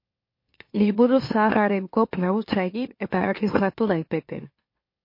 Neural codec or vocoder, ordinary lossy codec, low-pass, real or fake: autoencoder, 44.1 kHz, a latent of 192 numbers a frame, MeloTTS; MP3, 32 kbps; 5.4 kHz; fake